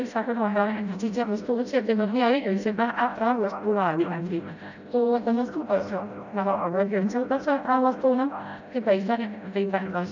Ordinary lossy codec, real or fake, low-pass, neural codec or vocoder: none; fake; 7.2 kHz; codec, 16 kHz, 0.5 kbps, FreqCodec, smaller model